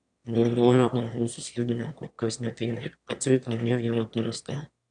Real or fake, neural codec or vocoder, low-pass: fake; autoencoder, 22.05 kHz, a latent of 192 numbers a frame, VITS, trained on one speaker; 9.9 kHz